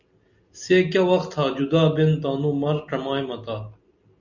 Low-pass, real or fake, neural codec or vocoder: 7.2 kHz; real; none